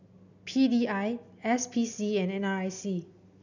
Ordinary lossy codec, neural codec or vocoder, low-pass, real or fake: none; none; 7.2 kHz; real